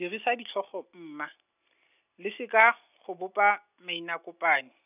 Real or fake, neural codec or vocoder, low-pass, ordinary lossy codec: real; none; 3.6 kHz; none